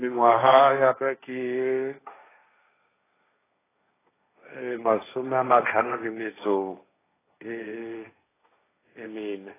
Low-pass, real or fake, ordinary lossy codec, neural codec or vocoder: 3.6 kHz; fake; AAC, 16 kbps; codec, 16 kHz, 1.1 kbps, Voila-Tokenizer